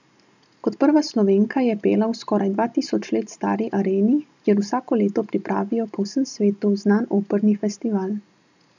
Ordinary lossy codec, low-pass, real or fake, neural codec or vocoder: none; 7.2 kHz; real; none